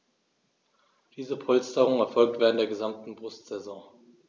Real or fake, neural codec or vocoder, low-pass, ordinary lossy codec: real; none; none; none